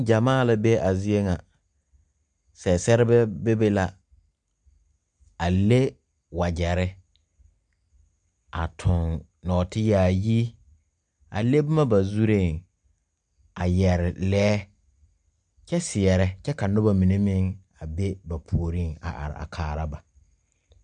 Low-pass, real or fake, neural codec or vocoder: 9.9 kHz; real; none